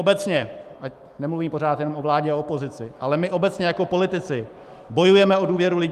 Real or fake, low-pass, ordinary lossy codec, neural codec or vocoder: fake; 14.4 kHz; Opus, 32 kbps; autoencoder, 48 kHz, 128 numbers a frame, DAC-VAE, trained on Japanese speech